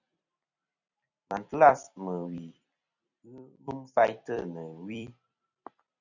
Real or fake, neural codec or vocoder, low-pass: fake; vocoder, 44.1 kHz, 128 mel bands every 256 samples, BigVGAN v2; 7.2 kHz